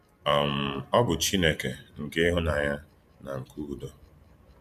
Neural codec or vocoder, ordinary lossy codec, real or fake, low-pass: vocoder, 44.1 kHz, 128 mel bands every 512 samples, BigVGAN v2; MP3, 96 kbps; fake; 14.4 kHz